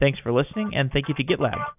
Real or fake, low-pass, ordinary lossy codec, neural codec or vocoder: real; 3.6 kHz; AAC, 32 kbps; none